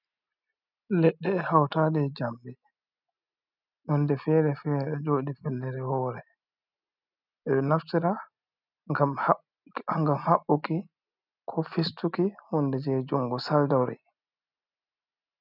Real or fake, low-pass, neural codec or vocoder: real; 5.4 kHz; none